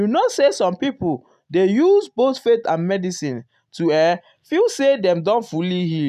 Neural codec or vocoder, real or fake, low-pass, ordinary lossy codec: none; real; 14.4 kHz; none